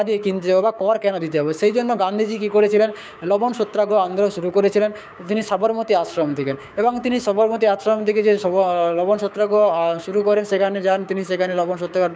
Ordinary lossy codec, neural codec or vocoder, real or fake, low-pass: none; codec, 16 kHz, 6 kbps, DAC; fake; none